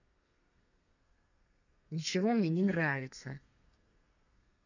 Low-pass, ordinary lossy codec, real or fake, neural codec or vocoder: 7.2 kHz; none; fake; codec, 32 kHz, 1.9 kbps, SNAC